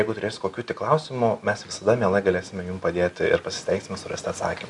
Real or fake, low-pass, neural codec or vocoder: real; 10.8 kHz; none